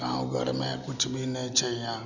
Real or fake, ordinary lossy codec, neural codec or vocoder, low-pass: real; none; none; 7.2 kHz